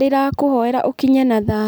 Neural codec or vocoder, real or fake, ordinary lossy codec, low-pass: none; real; none; none